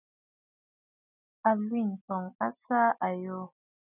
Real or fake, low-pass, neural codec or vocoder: real; 3.6 kHz; none